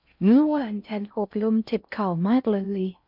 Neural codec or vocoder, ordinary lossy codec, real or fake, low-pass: codec, 16 kHz in and 24 kHz out, 0.6 kbps, FocalCodec, streaming, 4096 codes; none; fake; 5.4 kHz